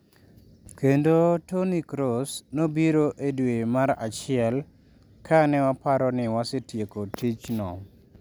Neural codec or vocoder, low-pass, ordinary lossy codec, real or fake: none; none; none; real